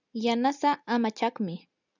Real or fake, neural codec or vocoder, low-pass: real; none; 7.2 kHz